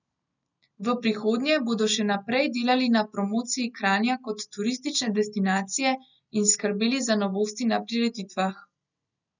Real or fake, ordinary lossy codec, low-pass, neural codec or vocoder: real; none; 7.2 kHz; none